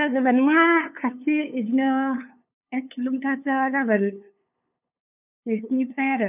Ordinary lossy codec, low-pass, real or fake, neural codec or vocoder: none; 3.6 kHz; fake; codec, 16 kHz, 2 kbps, FunCodec, trained on LibriTTS, 25 frames a second